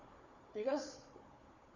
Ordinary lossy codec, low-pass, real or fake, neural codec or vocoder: none; 7.2 kHz; fake; codec, 16 kHz, 4 kbps, FunCodec, trained on Chinese and English, 50 frames a second